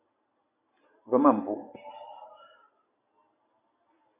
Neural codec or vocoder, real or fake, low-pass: none; real; 3.6 kHz